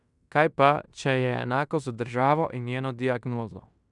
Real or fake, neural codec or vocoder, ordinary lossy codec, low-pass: fake; codec, 16 kHz in and 24 kHz out, 0.9 kbps, LongCat-Audio-Codec, fine tuned four codebook decoder; none; 10.8 kHz